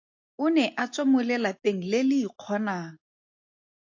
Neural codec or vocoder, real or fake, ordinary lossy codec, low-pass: none; real; AAC, 48 kbps; 7.2 kHz